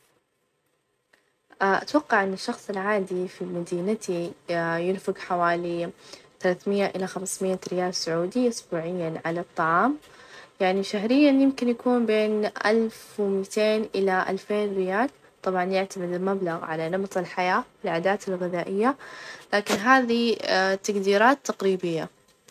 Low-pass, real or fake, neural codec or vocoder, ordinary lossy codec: 14.4 kHz; real; none; none